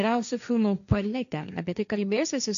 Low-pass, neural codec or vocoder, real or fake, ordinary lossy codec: 7.2 kHz; codec, 16 kHz, 1.1 kbps, Voila-Tokenizer; fake; MP3, 96 kbps